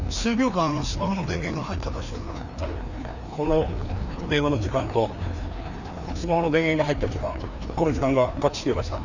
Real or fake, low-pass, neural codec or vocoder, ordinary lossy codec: fake; 7.2 kHz; codec, 16 kHz, 2 kbps, FreqCodec, larger model; none